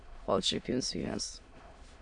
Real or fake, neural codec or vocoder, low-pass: fake; autoencoder, 22.05 kHz, a latent of 192 numbers a frame, VITS, trained on many speakers; 9.9 kHz